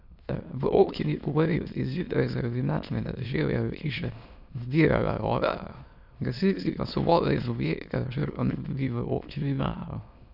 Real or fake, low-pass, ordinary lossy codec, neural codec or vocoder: fake; 5.4 kHz; none; autoencoder, 22.05 kHz, a latent of 192 numbers a frame, VITS, trained on many speakers